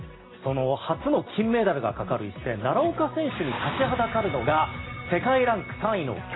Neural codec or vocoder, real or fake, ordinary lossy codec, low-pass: none; real; AAC, 16 kbps; 7.2 kHz